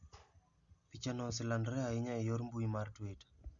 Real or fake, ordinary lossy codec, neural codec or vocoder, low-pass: real; Opus, 64 kbps; none; 7.2 kHz